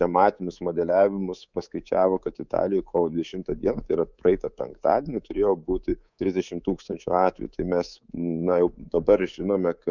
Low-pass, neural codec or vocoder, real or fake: 7.2 kHz; codec, 24 kHz, 3.1 kbps, DualCodec; fake